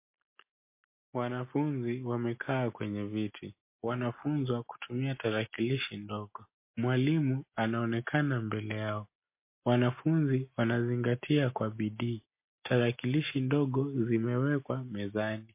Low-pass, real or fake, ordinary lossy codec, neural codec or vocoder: 3.6 kHz; real; MP3, 24 kbps; none